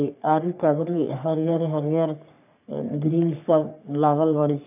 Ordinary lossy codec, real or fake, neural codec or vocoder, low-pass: none; fake; codec, 44.1 kHz, 3.4 kbps, Pupu-Codec; 3.6 kHz